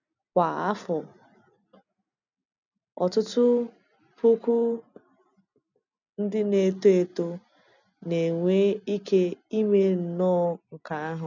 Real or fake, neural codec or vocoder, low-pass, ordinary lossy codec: real; none; 7.2 kHz; none